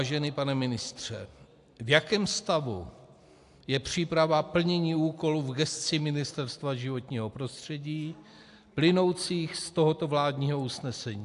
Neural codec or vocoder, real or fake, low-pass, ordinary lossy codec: none; real; 10.8 kHz; AAC, 64 kbps